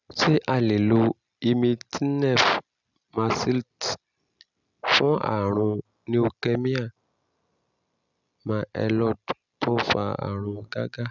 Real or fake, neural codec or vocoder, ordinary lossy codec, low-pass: real; none; none; 7.2 kHz